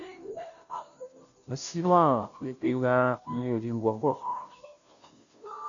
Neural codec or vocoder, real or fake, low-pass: codec, 16 kHz, 0.5 kbps, FunCodec, trained on Chinese and English, 25 frames a second; fake; 7.2 kHz